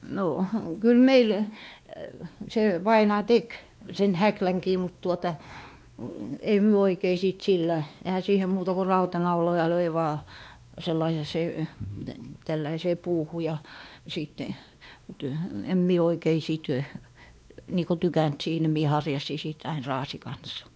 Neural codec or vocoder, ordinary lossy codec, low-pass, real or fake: codec, 16 kHz, 2 kbps, X-Codec, WavLM features, trained on Multilingual LibriSpeech; none; none; fake